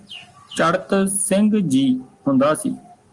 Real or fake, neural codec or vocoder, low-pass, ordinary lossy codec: real; none; 10.8 kHz; Opus, 24 kbps